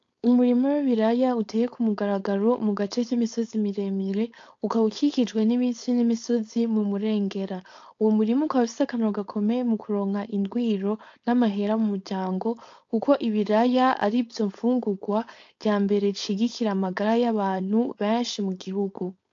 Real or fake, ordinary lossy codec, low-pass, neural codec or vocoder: fake; AAC, 48 kbps; 7.2 kHz; codec, 16 kHz, 4.8 kbps, FACodec